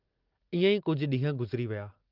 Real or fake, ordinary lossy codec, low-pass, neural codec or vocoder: real; Opus, 32 kbps; 5.4 kHz; none